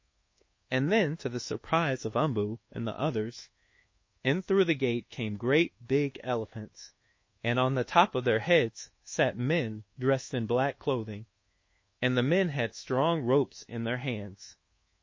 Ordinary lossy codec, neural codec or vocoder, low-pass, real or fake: MP3, 32 kbps; codec, 24 kHz, 1.2 kbps, DualCodec; 7.2 kHz; fake